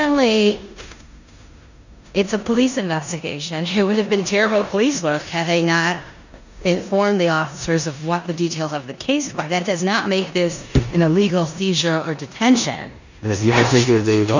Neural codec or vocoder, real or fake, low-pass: codec, 16 kHz in and 24 kHz out, 0.9 kbps, LongCat-Audio-Codec, four codebook decoder; fake; 7.2 kHz